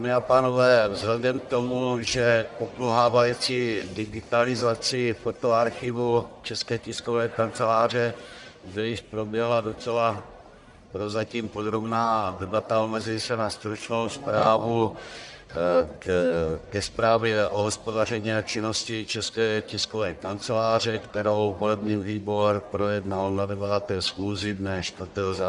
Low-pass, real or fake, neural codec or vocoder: 10.8 kHz; fake; codec, 44.1 kHz, 1.7 kbps, Pupu-Codec